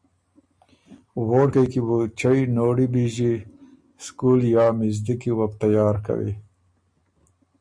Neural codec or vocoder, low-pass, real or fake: none; 9.9 kHz; real